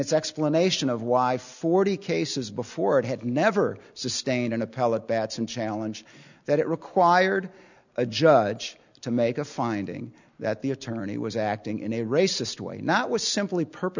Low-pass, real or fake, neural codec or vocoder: 7.2 kHz; real; none